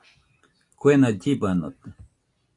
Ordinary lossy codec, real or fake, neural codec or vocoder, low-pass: AAC, 48 kbps; real; none; 10.8 kHz